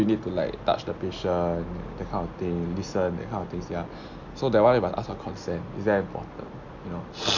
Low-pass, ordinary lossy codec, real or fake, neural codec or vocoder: 7.2 kHz; none; real; none